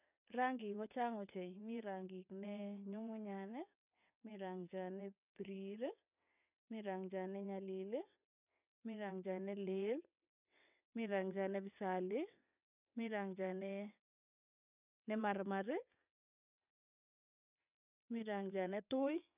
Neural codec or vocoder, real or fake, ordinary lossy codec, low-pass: vocoder, 22.05 kHz, 80 mel bands, WaveNeXt; fake; none; 3.6 kHz